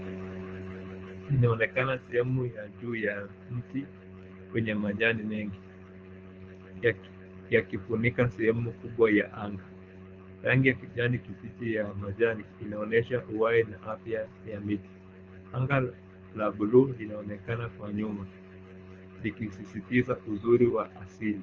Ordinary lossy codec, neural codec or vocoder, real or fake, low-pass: Opus, 24 kbps; codec, 24 kHz, 6 kbps, HILCodec; fake; 7.2 kHz